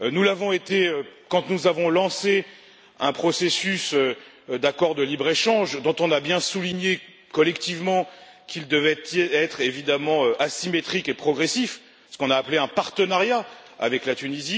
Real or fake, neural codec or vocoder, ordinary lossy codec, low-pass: real; none; none; none